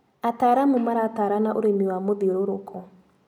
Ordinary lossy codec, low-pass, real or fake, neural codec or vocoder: none; 19.8 kHz; real; none